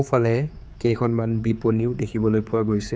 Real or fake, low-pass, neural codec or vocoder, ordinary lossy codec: fake; none; codec, 16 kHz, 4 kbps, X-Codec, HuBERT features, trained on general audio; none